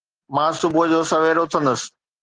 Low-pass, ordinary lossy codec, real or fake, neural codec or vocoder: 9.9 kHz; Opus, 16 kbps; real; none